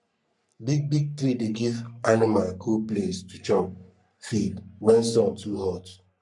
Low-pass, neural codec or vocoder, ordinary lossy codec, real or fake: 10.8 kHz; codec, 44.1 kHz, 3.4 kbps, Pupu-Codec; none; fake